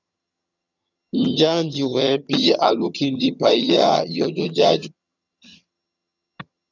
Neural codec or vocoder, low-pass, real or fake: vocoder, 22.05 kHz, 80 mel bands, HiFi-GAN; 7.2 kHz; fake